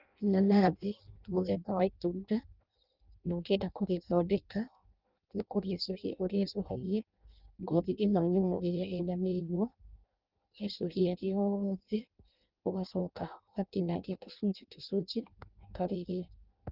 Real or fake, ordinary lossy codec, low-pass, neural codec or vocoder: fake; Opus, 32 kbps; 5.4 kHz; codec, 16 kHz in and 24 kHz out, 0.6 kbps, FireRedTTS-2 codec